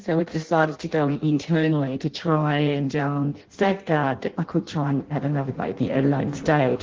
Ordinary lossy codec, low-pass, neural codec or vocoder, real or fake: Opus, 16 kbps; 7.2 kHz; codec, 16 kHz in and 24 kHz out, 0.6 kbps, FireRedTTS-2 codec; fake